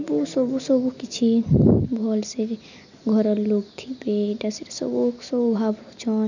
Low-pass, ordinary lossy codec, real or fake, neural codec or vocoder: 7.2 kHz; none; real; none